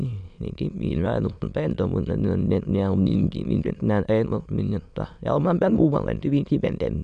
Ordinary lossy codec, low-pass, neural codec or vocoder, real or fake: none; 9.9 kHz; autoencoder, 22.05 kHz, a latent of 192 numbers a frame, VITS, trained on many speakers; fake